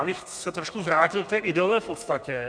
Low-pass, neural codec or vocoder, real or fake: 9.9 kHz; codec, 32 kHz, 1.9 kbps, SNAC; fake